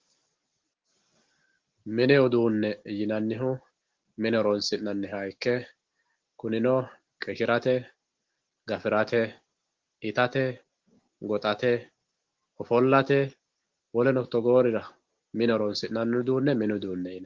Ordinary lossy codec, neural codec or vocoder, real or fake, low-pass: Opus, 16 kbps; none; real; 7.2 kHz